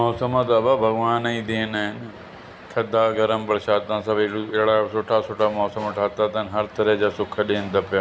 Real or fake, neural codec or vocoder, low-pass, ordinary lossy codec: real; none; none; none